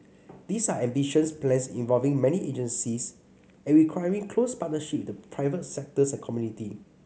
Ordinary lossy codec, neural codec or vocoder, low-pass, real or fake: none; none; none; real